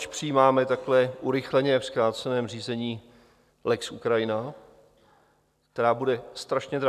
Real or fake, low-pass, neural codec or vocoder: real; 14.4 kHz; none